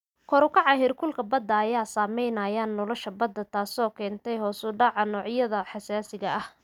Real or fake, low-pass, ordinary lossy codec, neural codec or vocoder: real; 19.8 kHz; none; none